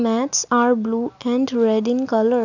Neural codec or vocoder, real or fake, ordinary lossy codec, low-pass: none; real; none; 7.2 kHz